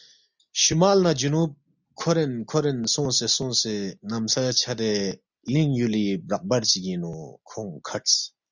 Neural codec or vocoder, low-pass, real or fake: none; 7.2 kHz; real